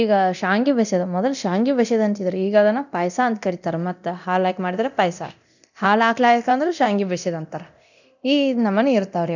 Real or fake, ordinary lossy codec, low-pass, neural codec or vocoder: fake; none; 7.2 kHz; codec, 24 kHz, 0.9 kbps, DualCodec